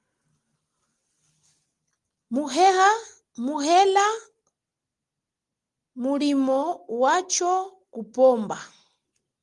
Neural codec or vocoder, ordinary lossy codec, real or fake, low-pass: none; Opus, 24 kbps; real; 10.8 kHz